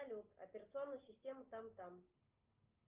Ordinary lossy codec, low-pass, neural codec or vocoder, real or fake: Opus, 32 kbps; 3.6 kHz; none; real